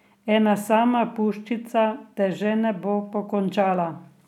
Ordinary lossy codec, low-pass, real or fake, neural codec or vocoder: none; 19.8 kHz; real; none